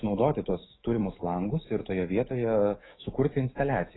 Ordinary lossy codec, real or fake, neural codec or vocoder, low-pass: AAC, 16 kbps; fake; autoencoder, 48 kHz, 128 numbers a frame, DAC-VAE, trained on Japanese speech; 7.2 kHz